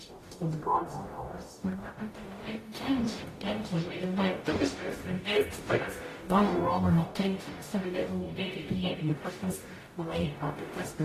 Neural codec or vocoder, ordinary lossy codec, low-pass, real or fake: codec, 44.1 kHz, 0.9 kbps, DAC; AAC, 48 kbps; 14.4 kHz; fake